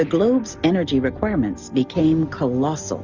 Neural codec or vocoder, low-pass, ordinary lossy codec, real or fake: none; 7.2 kHz; Opus, 64 kbps; real